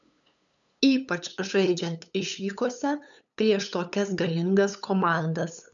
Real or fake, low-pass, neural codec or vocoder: fake; 7.2 kHz; codec, 16 kHz, 8 kbps, FunCodec, trained on LibriTTS, 25 frames a second